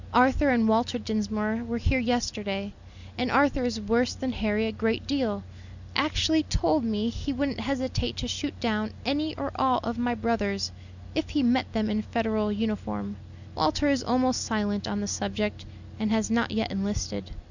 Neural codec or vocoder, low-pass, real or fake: none; 7.2 kHz; real